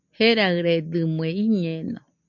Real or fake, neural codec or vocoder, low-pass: real; none; 7.2 kHz